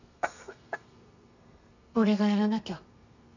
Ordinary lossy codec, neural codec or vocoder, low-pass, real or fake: none; codec, 32 kHz, 1.9 kbps, SNAC; 7.2 kHz; fake